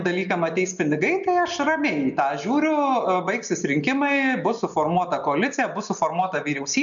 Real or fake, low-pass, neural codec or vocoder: real; 7.2 kHz; none